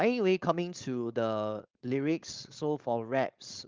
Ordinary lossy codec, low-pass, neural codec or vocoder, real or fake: Opus, 32 kbps; 7.2 kHz; codec, 16 kHz, 4.8 kbps, FACodec; fake